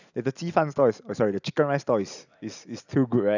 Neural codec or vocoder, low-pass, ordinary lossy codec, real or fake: none; 7.2 kHz; none; real